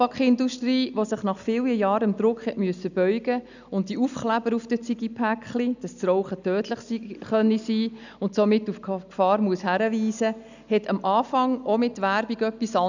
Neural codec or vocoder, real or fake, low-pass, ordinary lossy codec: none; real; 7.2 kHz; none